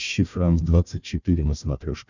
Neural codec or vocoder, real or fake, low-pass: codec, 16 kHz, 1 kbps, FunCodec, trained on Chinese and English, 50 frames a second; fake; 7.2 kHz